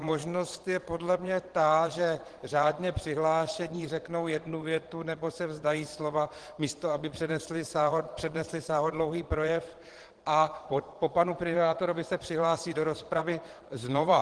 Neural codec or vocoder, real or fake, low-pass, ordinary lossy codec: vocoder, 22.05 kHz, 80 mel bands, Vocos; fake; 9.9 kHz; Opus, 16 kbps